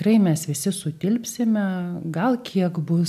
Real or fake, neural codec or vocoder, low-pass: real; none; 14.4 kHz